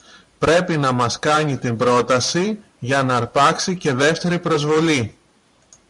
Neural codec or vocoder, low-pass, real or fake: vocoder, 44.1 kHz, 128 mel bands every 512 samples, BigVGAN v2; 10.8 kHz; fake